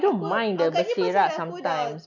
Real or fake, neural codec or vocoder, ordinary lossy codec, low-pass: real; none; none; 7.2 kHz